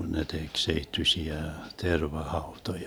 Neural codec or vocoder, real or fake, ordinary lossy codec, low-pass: vocoder, 44.1 kHz, 128 mel bands every 512 samples, BigVGAN v2; fake; none; none